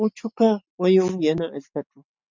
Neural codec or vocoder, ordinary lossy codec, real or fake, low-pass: none; MP3, 64 kbps; real; 7.2 kHz